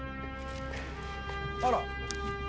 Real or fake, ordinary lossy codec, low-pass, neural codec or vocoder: real; none; none; none